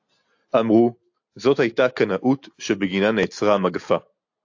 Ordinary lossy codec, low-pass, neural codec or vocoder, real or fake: AAC, 48 kbps; 7.2 kHz; none; real